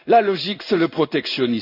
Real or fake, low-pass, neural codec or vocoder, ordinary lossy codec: fake; 5.4 kHz; codec, 16 kHz in and 24 kHz out, 1 kbps, XY-Tokenizer; none